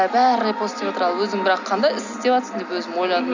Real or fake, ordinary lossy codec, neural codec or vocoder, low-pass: real; none; none; 7.2 kHz